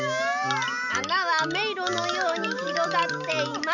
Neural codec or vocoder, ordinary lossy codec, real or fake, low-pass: none; none; real; 7.2 kHz